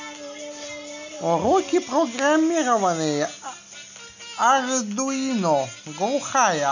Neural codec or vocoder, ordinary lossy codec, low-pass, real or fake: none; none; 7.2 kHz; real